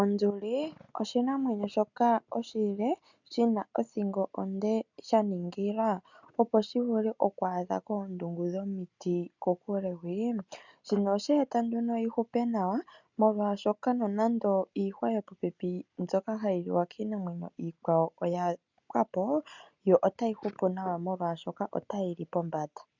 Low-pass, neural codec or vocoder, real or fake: 7.2 kHz; none; real